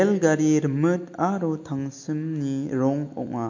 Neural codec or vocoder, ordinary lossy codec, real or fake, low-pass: none; MP3, 48 kbps; real; 7.2 kHz